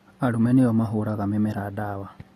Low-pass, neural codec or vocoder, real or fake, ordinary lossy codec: 19.8 kHz; none; real; AAC, 32 kbps